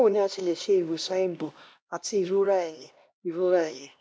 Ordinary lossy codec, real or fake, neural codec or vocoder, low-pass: none; fake; codec, 16 kHz, 1 kbps, X-Codec, HuBERT features, trained on LibriSpeech; none